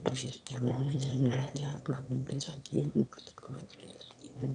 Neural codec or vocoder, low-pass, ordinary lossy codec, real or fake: autoencoder, 22.05 kHz, a latent of 192 numbers a frame, VITS, trained on one speaker; 9.9 kHz; none; fake